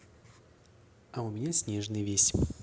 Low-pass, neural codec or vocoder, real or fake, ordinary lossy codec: none; none; real; none